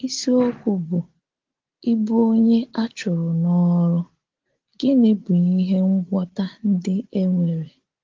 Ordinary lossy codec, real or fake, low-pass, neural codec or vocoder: Opus, 16 kbps; real; 7.2 kHz; none